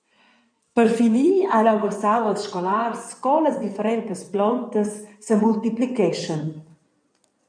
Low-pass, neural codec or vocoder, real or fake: 9.9 kHz; codec, 16 kHz in and 24 kHz out, 2.2 kbps, FireRedTTS-2 codec; fake